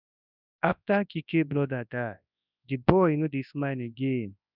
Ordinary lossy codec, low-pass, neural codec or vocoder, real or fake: none; 5.4 kHz; codec, 24 kHz, 0.9 kbps, WavTokenizer, large speech release; fake